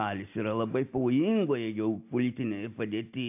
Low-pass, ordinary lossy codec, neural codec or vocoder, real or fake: 3.6 kHz; AAC, 32 kbps; none; real